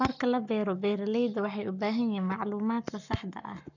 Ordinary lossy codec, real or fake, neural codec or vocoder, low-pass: none; fake; codec, 44.1 kHz, 7.8 kbps, Pupu-Codec; 7.2 kHz